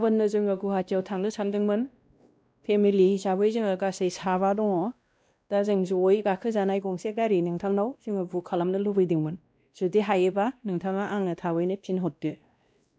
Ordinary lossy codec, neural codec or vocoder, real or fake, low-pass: none; codec, 16 kHz, 1 kbps, X-Codec, WavLM features, trained on Multilingual LibriSpeech; fake; none